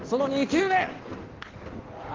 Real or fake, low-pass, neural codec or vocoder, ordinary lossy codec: fake; 7.2 kHz; codec, 16 kHz in and 24 kHz out, 1.1 kbps, FireRedTTS-2 codec; Opus, 16 kbps